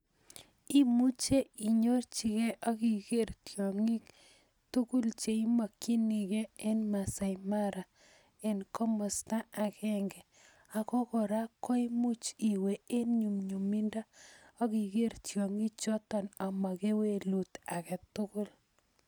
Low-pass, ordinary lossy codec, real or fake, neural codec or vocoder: none; none; real; none